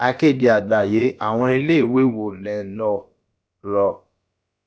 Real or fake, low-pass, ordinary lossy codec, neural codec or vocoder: fake; none; none; codec, 16 kHz, about 1 kbps, DyCAST, with the encoder's durations